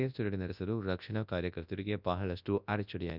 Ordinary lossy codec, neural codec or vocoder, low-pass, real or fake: none; codec, 24 kHz, 0.9 kbps, WavTokenizer, large speech release; 5.4 kHz; fake